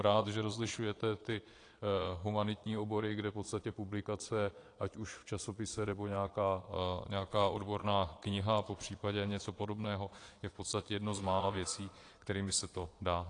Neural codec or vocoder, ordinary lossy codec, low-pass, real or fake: vocoder, 22.05 kHz, 80 mel bands, Vocos; AAC, 48 kbps; 9.9 kHz; fake